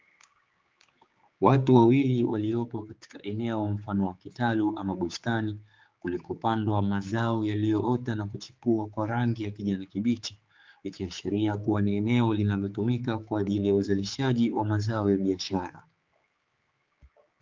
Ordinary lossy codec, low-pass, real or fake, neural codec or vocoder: Opus, 24 kbps; 7.2 kHz; fake; codec, 16 kHz, 4 kbps, X-Codec, HuBERT features, trained on general audio